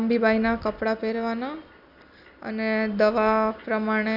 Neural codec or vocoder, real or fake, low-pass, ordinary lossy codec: none; real; 5.4 kHz; none